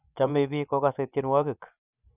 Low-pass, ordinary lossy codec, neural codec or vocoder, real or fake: 3.6 kHz; none; vocoder, 24 kHz, 100 mel bands, Vocos; fake